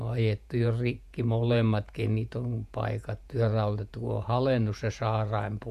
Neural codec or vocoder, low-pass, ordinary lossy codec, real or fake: vocoder, 48 kHz, 128 mel bands, Vocos; 14.4 kHz; MP3, 96 kbps; fake